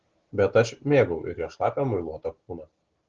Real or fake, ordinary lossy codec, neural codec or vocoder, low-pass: real; Opus, 16 kbps; none; 7.2 kHz